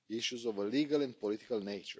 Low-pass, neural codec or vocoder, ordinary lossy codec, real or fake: none; none; none; real